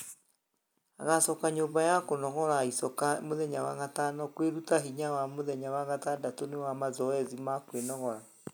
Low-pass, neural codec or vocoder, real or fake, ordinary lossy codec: none; none; real; none